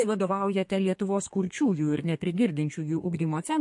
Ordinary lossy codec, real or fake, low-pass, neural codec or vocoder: MP3, 48 kbps; fake; 10.8 kHz; codec, 32 kHz, 1.9 kbps, SNAC